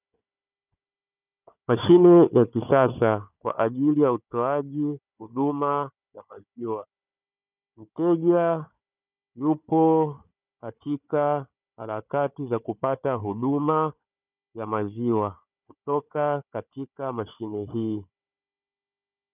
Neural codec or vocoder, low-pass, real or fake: codec, 16 kHz, 4 kbps, FunCodec, trained on Chinese and English, 50 frames a second; 3.6 kHz; fake